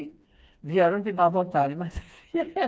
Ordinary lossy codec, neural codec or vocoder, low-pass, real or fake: none; codec, 16 kHz, 2 kbps, FreqCodec, smaller model; none; fake